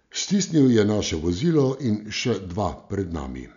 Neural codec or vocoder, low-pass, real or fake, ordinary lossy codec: none; 7.2 kHz; real; none